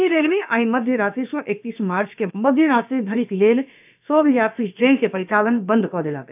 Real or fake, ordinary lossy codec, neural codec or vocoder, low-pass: fake; none; codec, 16 kHz, about 1 kbps, DyCAST, with the encoder's durations; 3.6 kHz